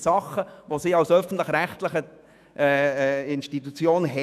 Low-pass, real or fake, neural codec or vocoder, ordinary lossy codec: 14.4 kHz; fake; autoencoder, 48 kHz, 128 numbers a frame, DAC-VAE, trained on Japanese speech; none